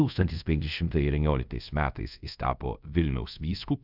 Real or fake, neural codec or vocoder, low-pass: fake; codec, 24 kHz, 0.5 kbps, DualCodec; 5.4 kHz